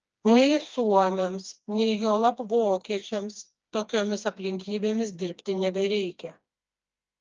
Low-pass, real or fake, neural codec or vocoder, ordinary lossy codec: 7.2 kHz; fake; codec, 16 kHz, 2 kbps, FreqCodec, smaller model; Opus, 24 kbps